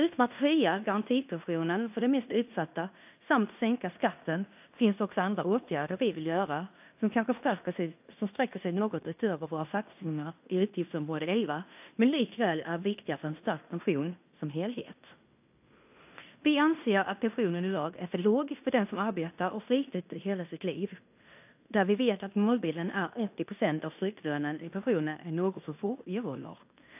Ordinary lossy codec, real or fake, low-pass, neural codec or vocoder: AAC, 32 kbps; fake; 3.6 kHz; codec, 16 kHz in and 24 kHz out, 0.9 kbps, LongCat-Audio-Codec, fine tuned four codebook decoder